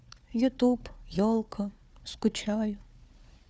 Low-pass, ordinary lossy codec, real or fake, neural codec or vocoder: none; none; fake; codec, 16 kHz, 4 kbps, FunCodec, trained on Chinese and English, 50 frames a second